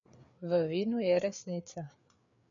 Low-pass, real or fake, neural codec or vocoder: 7.2 kHz; fake; codec, 16 kHz, 4 kbps, FreqCodec, larger model